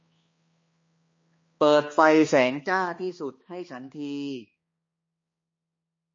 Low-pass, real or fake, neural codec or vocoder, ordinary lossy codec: 7.2 kHz; fake; codec, 16 kHz, 2 kbps, X-Codec, HuBERT features, trained on balanced general audio; MP3, 32 kbps